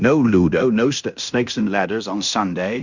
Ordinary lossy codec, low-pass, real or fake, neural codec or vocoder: Opus, 64 kbps; 7.2 kHz; fake; codec, 16 kHz in and 24 kHz out, 0.9 kbps, LongCat-Audio-Codec, fine tuned four codebook decoder